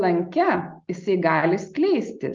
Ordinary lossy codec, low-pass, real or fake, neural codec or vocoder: Opus, 32 kbps; 7.2 kHz; real; none